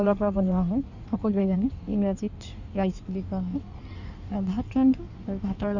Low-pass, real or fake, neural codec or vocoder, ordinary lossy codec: 7.2 kHz; fake; codec, 16 kHz in and 24 kHz out, 1.1 kbps, FireRedTTS-2 codec; none